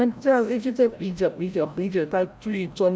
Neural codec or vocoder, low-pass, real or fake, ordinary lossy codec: codec, 16 kHz, 0.5 kbps, FreqCodec, larger model; none; fake; none